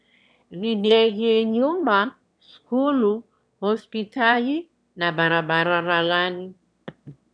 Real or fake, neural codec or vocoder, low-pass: fake; autoencoder, 22.05 kHz, a latent of 192 numbers a frame, VITS, trained on one speaker; 9.9 kHz